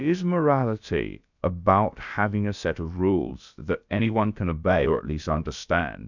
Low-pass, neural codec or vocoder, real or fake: 7.2 kHz; codec, 16 kHz, about 1 kbps, DyCAST, with the encoder's durations; fake